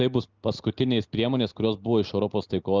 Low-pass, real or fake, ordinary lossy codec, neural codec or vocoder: 7.2 kHz; real; Opus, 24 kbps; none